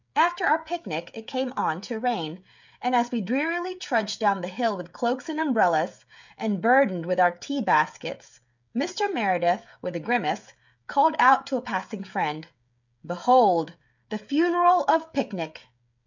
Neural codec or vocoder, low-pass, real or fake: codec, 16 kHz, 16 kbps, FreqCodec, smaller model; 7.2 kHz; fake